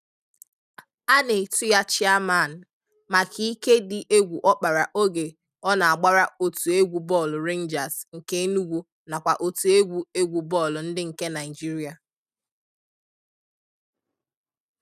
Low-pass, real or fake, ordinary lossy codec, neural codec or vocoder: 14.4 kHz; real; none; none